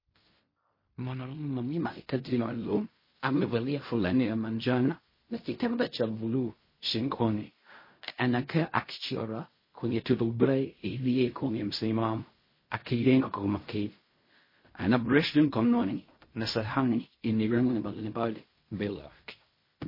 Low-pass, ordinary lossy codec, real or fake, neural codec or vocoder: 5.4 kHz; MP3, 24 kbps; fake; codec, 16 kHz in and 24 kHz out, 0.4 kbps, LongCat-Audio-Codec, fine tuned four codebook decoder